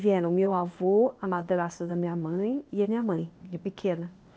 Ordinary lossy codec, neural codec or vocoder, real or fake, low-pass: none; codec, 16 kHz, 0.8 kbps, ZipCodec; fake; none